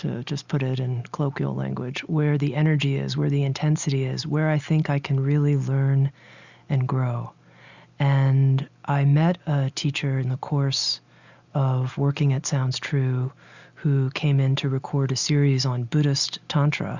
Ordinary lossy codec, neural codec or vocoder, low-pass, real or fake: Opus, 64 kbps; none; 7.2 kHz; real